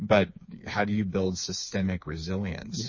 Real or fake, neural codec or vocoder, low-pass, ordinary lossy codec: fake; codec, 16 kHz, 4 kbps, FreqCodec, smaller model; 7.2 kHz; MP3, 32 kbps